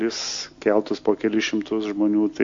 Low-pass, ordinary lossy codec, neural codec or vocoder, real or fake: 7.2 kHz; MP3, 48 kbps; none; real